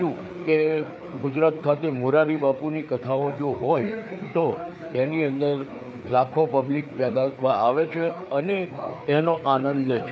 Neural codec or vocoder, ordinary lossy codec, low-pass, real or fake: codec, 16 kHz, 4 kbps, FreqCodec, larger model; none; none; fake